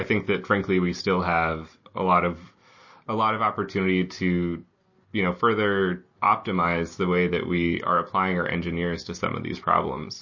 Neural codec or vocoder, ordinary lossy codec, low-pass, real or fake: none; MP3, 32 kbps; 7.2 kHz; real